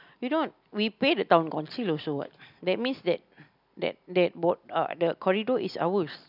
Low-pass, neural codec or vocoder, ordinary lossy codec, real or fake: 5.4 kHz; none; none; real